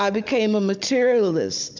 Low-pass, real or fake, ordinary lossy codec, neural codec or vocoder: 7.2 kHz; fake; MP3, 64 kbps; codec, 16 kHz, 16 kbps, FunCodec, trained on Chinese and English, 50 frames a second